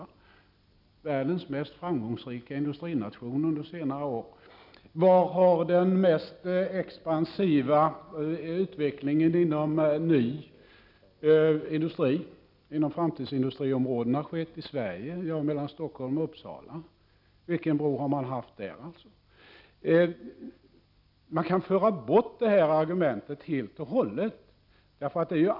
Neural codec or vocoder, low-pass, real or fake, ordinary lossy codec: none; 5.4 kHz; real; none